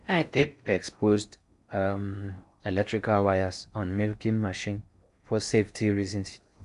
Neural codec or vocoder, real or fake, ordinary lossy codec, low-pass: codec, 16 kHz in and 24 kHz out, 0.6 kbps, FocalCodec, streaming, 4096 codes; fake; none; 10.8 kHz